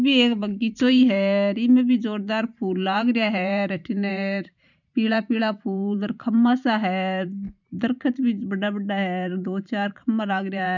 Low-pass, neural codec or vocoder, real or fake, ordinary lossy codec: 7.2 kHz; vocoder, 44.1 kHz, 128 mel bands every 512 samples, BigVGAN v2; fake; none